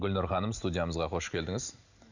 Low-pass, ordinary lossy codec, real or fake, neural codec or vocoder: 7.2 kHz; none; real; none